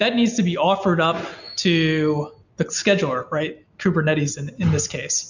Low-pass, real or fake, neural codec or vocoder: 7.2 kHz; real; none